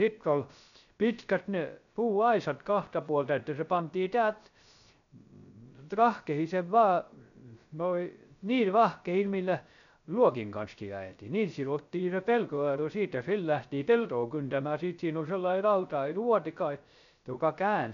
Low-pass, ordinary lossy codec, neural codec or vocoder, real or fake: 7.2 kHz; none; codec, 16 kHz, 0.3 kbps, FocalCodec; fake